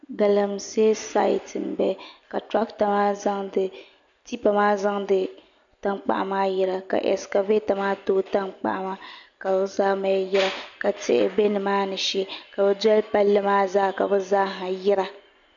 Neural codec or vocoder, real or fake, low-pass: none; real; 7.2 kHz